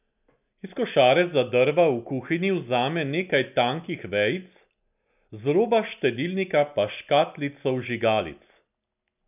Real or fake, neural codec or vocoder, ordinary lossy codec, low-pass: real; none; none; 3.6 kHz